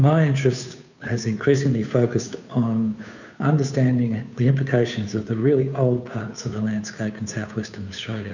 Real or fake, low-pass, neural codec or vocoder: fake; 7.2 kHz; codec, 16 kHz, 2 kbps, FunCodec, trained on Chinese and English, 25 frames a second